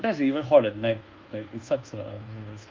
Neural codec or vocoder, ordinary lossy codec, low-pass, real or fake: codec, 24 kHz, 1.2 kbps, DualCodec; Opus, 24 kbps; 7.2 kHz; fake